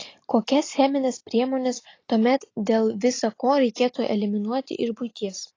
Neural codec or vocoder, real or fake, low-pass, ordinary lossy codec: none; real; 7.2 kHz; AAC, 32 kbps